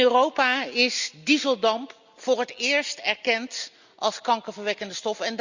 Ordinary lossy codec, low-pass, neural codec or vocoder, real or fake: Opus, 64 kbps; 7.2 kHz; none; real